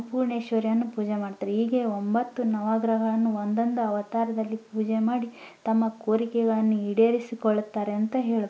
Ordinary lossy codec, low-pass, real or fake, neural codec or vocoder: none; none; real; none